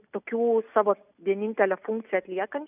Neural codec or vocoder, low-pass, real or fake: none; 3.6 kHz; real